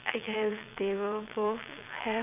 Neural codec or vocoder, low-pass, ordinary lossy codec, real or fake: vocoder, 22.05 kHz, 80 mel bands, WaveNeXt; 3.6 kHz; none; fake